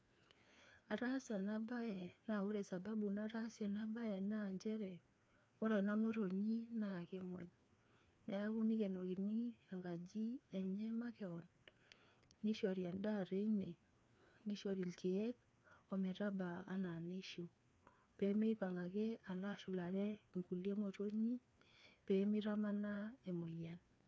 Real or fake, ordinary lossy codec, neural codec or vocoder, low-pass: fake; none; codec, 16 kHz, 2 kbps, FreqCodec, larger model; none